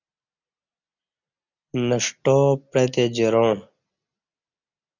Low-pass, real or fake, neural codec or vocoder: 7.2 kHz; real; none